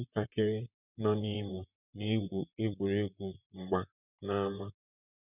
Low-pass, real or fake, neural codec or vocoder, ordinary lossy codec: 3.6 kHz; fake; vocoder, 44.1 kHz, 128 mel bands, Pupu-Vocoder; none